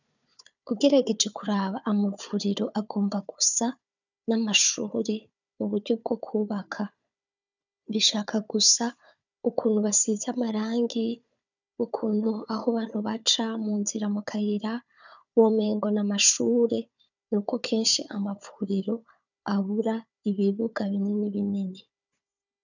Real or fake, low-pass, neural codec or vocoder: fake; 7.2 kHz; codec, 16 kHz, 4 kbps, FunCodec, trained on Chinese and English, 50 frames a second